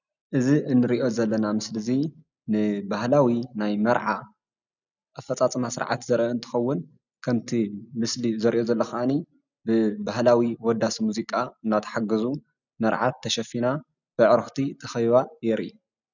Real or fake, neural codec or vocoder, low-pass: real; none; 7.2 kHz